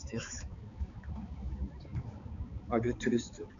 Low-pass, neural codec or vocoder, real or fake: 7.2 kHz; codec, 16 kHz, 4 kbps, X-Codec, HuBERT features, trained on balanced general audio; fake